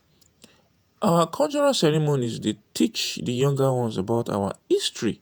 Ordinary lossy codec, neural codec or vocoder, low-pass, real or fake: none; vocoder, 48 kHz, 128 mel bands, Vocos; none; fake